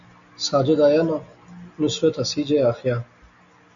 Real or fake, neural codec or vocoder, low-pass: real; none; 7.2 kHz